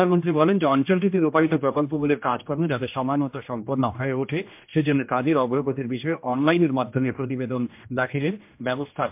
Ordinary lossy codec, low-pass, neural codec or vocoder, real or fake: MP3, 32 kbps; 3.6 kHz; codec, 16 kHz, 1 kbps, X-Codec, HuBERT features, trained on general audio; fake